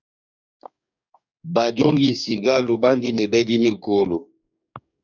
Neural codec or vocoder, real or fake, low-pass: codec, 44.1 kHz, 2.6 kbps, DAC; fake; 7.2 kHz